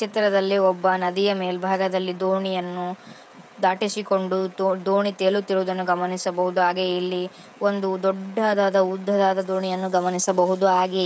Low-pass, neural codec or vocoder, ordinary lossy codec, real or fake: none; codec, 16 kHz, 16 kbps, FreqCodec, smaller model; none; fake